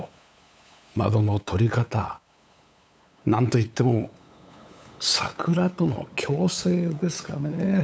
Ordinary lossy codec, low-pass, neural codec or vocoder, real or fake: none; none; codec, 16 kHz, 8 kbps, FunCodec, trained on LibriTTS, 25 frames a second; fake